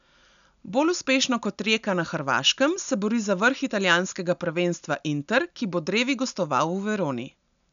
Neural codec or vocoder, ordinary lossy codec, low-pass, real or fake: none; none; 7.2 kHz; real